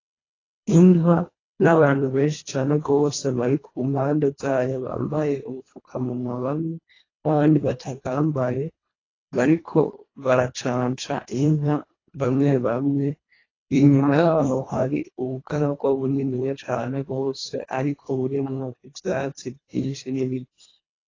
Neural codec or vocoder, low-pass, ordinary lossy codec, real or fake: codec, 24 kHz, 1.5 kbps, HILCodec; 7.2 kHz; AAC, 32 kbps; fake